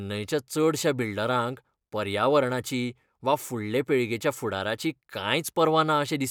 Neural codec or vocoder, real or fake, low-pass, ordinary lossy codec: none; real; 19.8 kHz; none